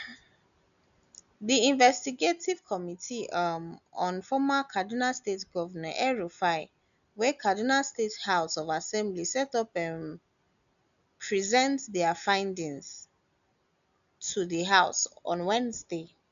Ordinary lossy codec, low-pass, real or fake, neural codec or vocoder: none; 7.2 kHz; real; none